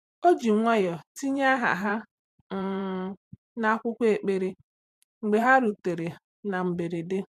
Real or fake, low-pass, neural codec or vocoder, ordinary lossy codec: fake; 14.4 kHz; vocoder, 44.1 kHz, 128 mel bands every 512 samples, BigVGAN v2; MP3, 96 kbps